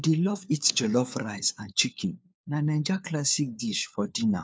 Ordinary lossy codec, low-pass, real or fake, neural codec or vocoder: none; none; fake; codec, 16 kHz, 4 kbps, FunCodec, trained on LibriTTS, 50 frames a second